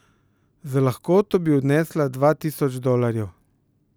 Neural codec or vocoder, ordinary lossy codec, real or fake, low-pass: none; none; real; none